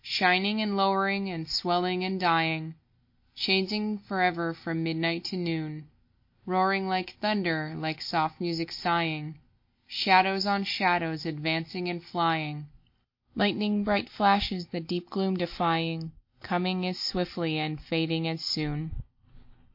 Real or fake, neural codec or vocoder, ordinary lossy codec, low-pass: real; none; MP3, 32 kbps; 5.4 kHz